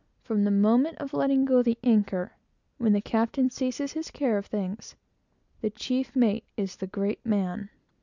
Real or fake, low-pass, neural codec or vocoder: real; 7.2 kHz; none